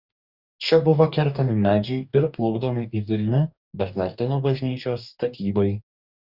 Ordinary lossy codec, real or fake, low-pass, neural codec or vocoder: Opus, 64 kbps; fake; 5.4 kHz; codec, 44.1 kHz, 2.6 kbps, DAC